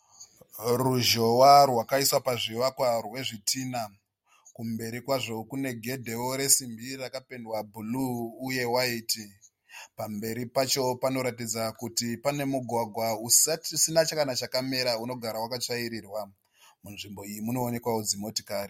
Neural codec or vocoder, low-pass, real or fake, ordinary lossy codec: none; 19.8 kHz; real; MP3, 64 kbps